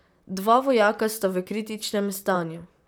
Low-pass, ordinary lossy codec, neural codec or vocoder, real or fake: none; none; vocoder, 44.1 kHz, 128 mel bands, Pupu-Vocoder; fake